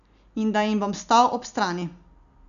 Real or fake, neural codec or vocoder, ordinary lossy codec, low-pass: real; none; none; 7.2 kHz